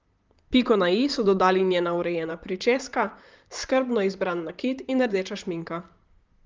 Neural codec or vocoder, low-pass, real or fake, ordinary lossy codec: vocoder, 44.1 kHz, 128 mel bands, Pupu-Vocoder; 7.2 kHz; fake; Opus, 32 kbps